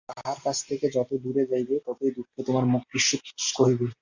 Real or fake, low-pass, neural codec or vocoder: real; 7.2 kHz; none